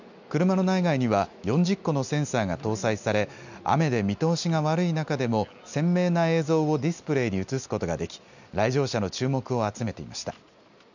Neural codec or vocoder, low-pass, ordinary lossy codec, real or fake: none; 7.2 kHz; none; real